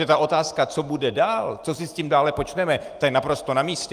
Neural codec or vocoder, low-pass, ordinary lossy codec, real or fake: none; 14.4 kHz; Opus, 32 kbps; real